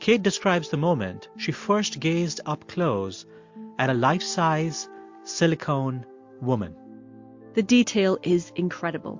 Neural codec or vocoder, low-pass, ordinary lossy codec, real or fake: none; 7.2 kHz; MP3, 48 kbps; real